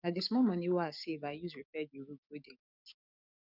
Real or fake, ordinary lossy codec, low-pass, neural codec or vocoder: fake; none; 5.4 kHz; codec, 44.1 kHz, 7.8 kbps, DAC